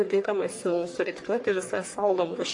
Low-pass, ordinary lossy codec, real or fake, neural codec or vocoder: 10.8 kHz; AAC, 48 kbps; fake; codec, 44.1 kHz, 1.7 kbps, Pupu-Codec